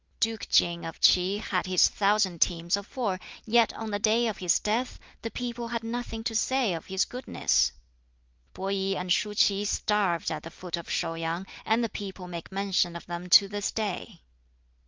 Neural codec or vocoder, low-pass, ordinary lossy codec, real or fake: none; 7.2 kHz; Opus, 32 kbps; real